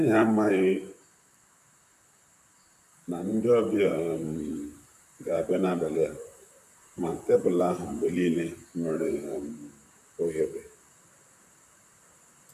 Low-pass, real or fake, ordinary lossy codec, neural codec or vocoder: 14.4 kHz; fake; none; vocoder, 44.1 kHz, 128 mel bands, Pupu-Vocoder